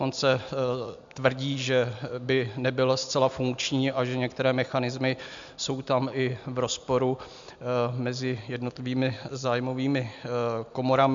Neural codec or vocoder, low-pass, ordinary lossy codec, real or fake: none; 7.2 kHz; MP3, 64 kbps; real